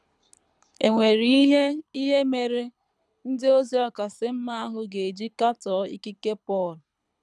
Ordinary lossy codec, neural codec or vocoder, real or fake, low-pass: none; codec, 24 kHz, 6 kbps, HILCodec; fake; none